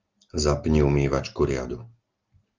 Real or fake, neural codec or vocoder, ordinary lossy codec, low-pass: real; none; Opus, 32 kbps; 7.2 kHz